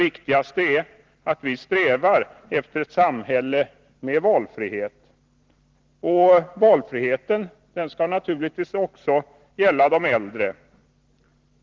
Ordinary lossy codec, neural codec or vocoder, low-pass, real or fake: Opus, 16 kbps; none; 7.2 kHz; real